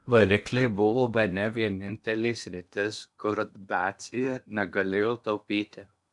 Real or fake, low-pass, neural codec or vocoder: fake; 10.8 kHz; codec, 16 kHz in and 24 kHz out, 0.8 kbps, FocalCodec, streaming, 65536 codes